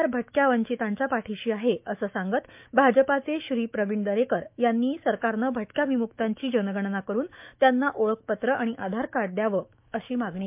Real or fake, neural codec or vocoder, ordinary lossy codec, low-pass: fake; autoencoder, 48 kHz, 128 numbers a frame, DAC-VAE, trained on Japanese speech; MP3, 32 kbps; 3.6 kHz